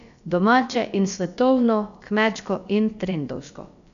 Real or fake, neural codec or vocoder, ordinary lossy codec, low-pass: fake; codec, 16 kHz, about 1 kbps, DyCAST, with the encoder's durations; none; 7.2 kHz